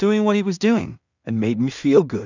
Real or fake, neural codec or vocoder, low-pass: fake; codec, 16 kHz in and 24 kHz out, 0.4 kbps, LongCat-Audio-Codec, two codebook decoder; 7.2 kHz